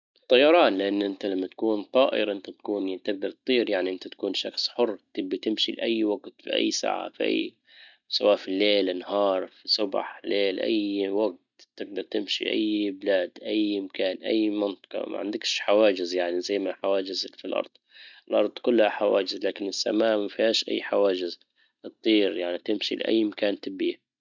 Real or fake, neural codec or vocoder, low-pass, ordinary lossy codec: real; none; 7.2 kHz; none